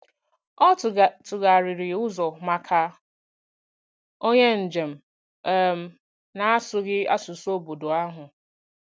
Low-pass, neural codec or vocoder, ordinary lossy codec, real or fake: none; none; none; real